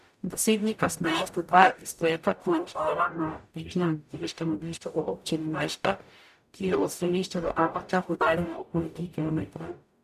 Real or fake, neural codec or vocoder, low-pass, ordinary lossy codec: fake; codec, 44.1 kHz, 0.9 kbps, DAC; 14.4 kHz; none